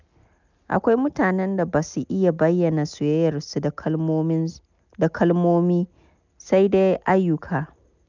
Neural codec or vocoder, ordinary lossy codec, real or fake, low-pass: none; none; real; 7.2 kHz